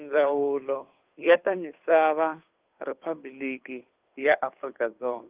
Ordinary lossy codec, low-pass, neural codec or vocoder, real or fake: Opus, 24 kbps; 3.6 kHz; codec, 16 kHz, 2 kbps, FunCodec, trained on Chinese and English, 25 frames a second; fake